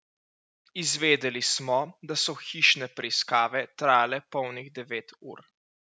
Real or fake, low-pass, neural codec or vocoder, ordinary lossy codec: real; none; none; none